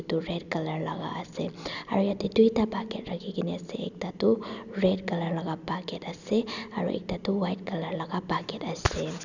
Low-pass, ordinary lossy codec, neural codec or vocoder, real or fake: 7.2 kHz; none; none; real